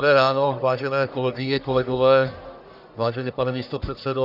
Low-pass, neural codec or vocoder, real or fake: 5.4 kHz; codec, 44.1 kHz, 1.7 kbps, Pupu-Codec; fake